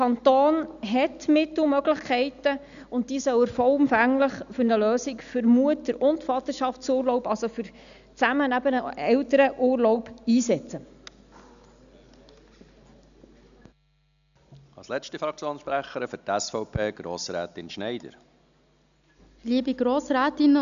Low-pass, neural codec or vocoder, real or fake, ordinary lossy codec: 7.2 kHz; none; real; none